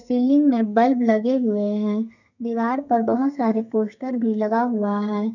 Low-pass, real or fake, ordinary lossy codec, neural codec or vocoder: 7.2 kHz; fake; none; codec, 32 kHz, 1.9 kbps, SNAC